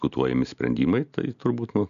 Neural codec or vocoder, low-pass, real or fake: none; 7.2 kHz; real